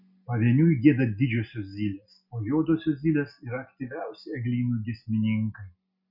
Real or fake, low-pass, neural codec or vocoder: real; 5.4 kHz; none